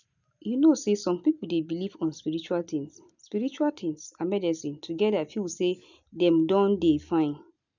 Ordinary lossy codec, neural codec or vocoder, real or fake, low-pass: none; none; real; 7.2 kHz